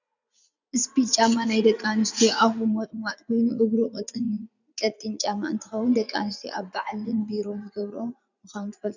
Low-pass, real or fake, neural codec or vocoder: 7.2 kHz; fake; vocoder, 44.1 kHz, 80 mel bands, Vocos